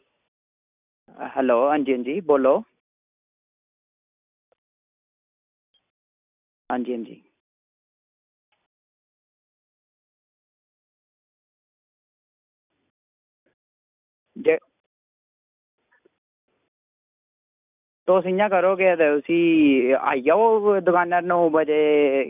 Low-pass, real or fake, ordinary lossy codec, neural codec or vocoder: 3.6 kHz; real; none; none